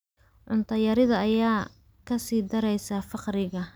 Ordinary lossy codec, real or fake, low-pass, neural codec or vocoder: none; real; none; none